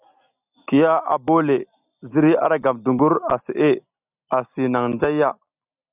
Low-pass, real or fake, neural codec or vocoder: 3.6 kHz; real; none